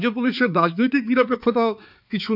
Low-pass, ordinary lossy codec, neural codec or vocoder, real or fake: 5.4 kHz; none; codec, 16 kHz, 4 kbps, X-Codec, HuBERT features, trained on balanced general audio; fake